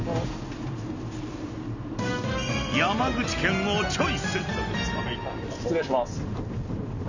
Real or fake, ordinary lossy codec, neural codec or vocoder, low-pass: real; none; none; 7.2 kHz